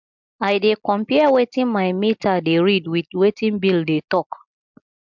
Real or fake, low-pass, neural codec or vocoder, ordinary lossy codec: real; 7.2 kHz; none; MP3, 64 kbps